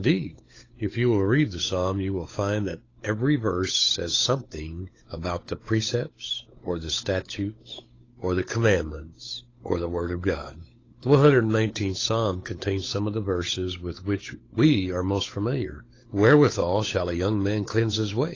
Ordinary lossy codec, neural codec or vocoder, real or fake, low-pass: AAC, 32 kbps; codec, 16 kHz, 8 kbps, FunCodec, trained on Chinese and English, 25 frames a second; fake; 7.2 kHz